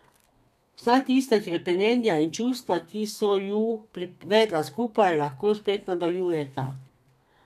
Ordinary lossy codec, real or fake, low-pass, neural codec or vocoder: none; fake; 14.4 kHz; codec, 32 kHz, 1.9 kbps, SNAC